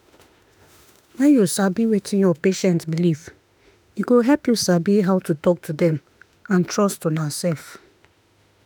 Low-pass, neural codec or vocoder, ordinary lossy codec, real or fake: none; autoencoder, 48 kHz, 32 numbers a frame, DAC-VAE, trained on Japanese speech; none; fake